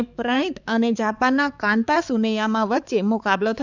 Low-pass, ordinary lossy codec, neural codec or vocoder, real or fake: 7.2 kHz; none; codec, 16 kHz, 4 kbps, X-Codec, HuBERT features, trained on balanced general audio; fake